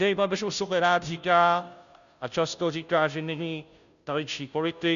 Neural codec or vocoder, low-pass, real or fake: codec, 16 kHz, 0.5 kbps, FunCodec, trained on Chinese and English, 25 frames a second; 7.2 kHz; fake